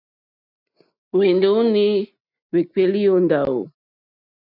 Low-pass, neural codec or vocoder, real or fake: 5.4 kHz; none; real